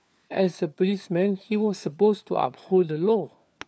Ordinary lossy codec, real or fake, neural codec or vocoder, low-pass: none; fake; codec, 16 kHz, 4 kbps, FunCodec, trained on LibriTTS, 50 frames a second; none